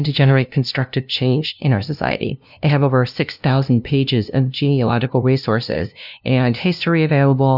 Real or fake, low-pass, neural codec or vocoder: fake; 5.4 kHz; codec, 16 kHz, 0.5 kbps, FunCodec, trained on LibriTTS, 25 frames a second